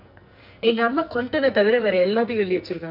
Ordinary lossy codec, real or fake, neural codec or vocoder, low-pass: none; fake; codec, 44.1 kHz, 2.6 kbps, SNAC; 5.4 kHz